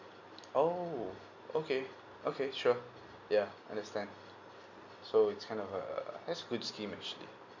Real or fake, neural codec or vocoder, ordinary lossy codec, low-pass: real; none; none; 7.2 kHz